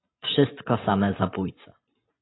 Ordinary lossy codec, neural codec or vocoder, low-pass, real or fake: AAC, 16 kbps; vocoder, 44.1 kHz, 128 mel bands every 512 samples, BigVGAN v2; 7.2 kHz; fake